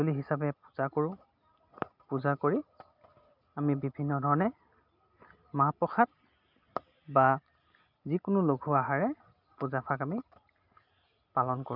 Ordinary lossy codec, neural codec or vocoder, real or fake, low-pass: none; none; real; 5.4 kHz